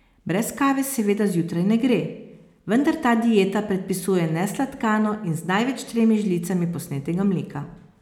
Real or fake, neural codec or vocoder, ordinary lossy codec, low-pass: real; none; none; 19.8 kHz